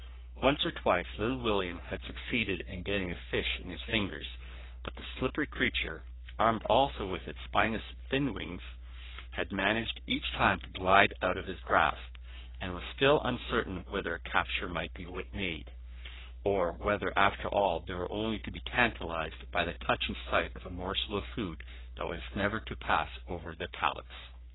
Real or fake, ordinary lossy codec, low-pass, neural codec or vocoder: fake; AAC, 16 kbps; 7.2 kHz; codec, 44.1 kHz, 3.4 kbps, Pupu-Codec